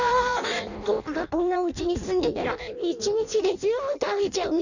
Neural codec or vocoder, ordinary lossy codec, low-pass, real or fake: codec, 16 kHz in and 24 kHz out, 0.6 kbps, FireRedTTS-2 codec; none; 7.2 kHz; fake